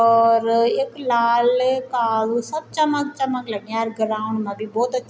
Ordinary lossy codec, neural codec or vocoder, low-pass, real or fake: none; none; none; real